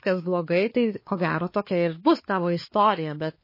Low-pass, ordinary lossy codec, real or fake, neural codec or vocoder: 5.4 kHz; MP3, 24 kbps; fake; codec, 16 kHz, 4 kbps, X-Codec, HuBERT features, trained on balanced general audio